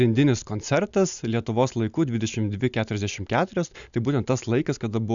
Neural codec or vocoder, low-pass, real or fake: none; 7.2 kHz; real